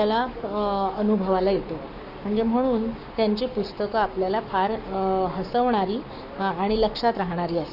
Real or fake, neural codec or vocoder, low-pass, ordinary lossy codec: fake; codec, 44.1 kHz, 7.8 kbps, Pupu-Codec; 5.4 kHz; none